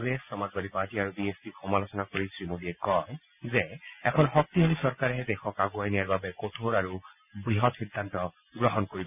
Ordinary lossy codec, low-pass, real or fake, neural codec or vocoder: none; 3.6 kHz; real; none